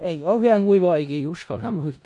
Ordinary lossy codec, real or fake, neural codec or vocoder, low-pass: MP3, 96 kbps; fake; codec, 16 kHz in and 24 kHz out, 0.4 kbps, LongCat-Audio-Codec, four codebook decoder; 10.8 kHz